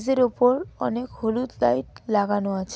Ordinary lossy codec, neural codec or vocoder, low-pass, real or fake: none; none; none; real